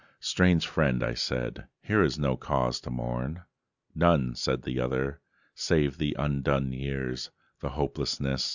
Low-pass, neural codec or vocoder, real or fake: 7.2 kHz; none; real